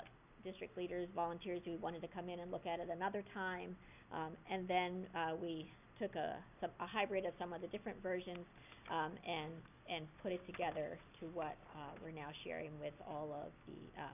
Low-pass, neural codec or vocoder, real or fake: 3.6 kHz; none; real